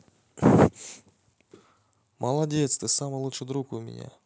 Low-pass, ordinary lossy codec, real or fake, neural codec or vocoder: none; none; real; none